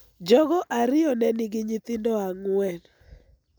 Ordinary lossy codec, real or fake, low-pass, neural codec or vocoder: none; real; none; none